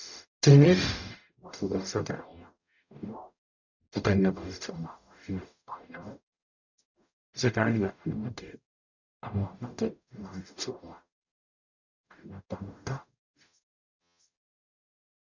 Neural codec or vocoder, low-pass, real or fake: codec, 44.1 kHz, 0.9 kbps, DAC; 7.2 kHz; fake